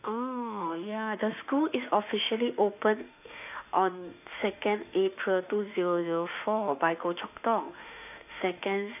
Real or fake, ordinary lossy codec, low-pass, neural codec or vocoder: fake; none; 3.6 kHz; autoencoder, 48 kHz, 32 numbers a frame, DAC-VAE, trained on Japanese speech